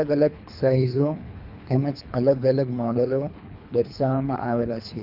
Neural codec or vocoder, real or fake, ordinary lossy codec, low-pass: codec, 24 kHz, 3 kbps, HILCodec; fake; none; 5.4 kHz